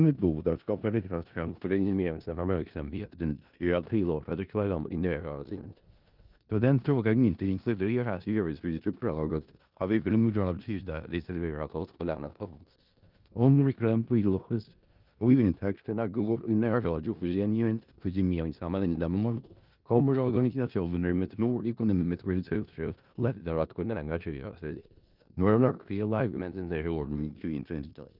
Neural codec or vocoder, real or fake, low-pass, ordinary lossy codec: codec, 16 kHz in and 24 kHz out, 0.4 kbps, LongCat-Audio-Codec, four codebook decoder; fake; 5.4 kHz; Opus, 24 kbps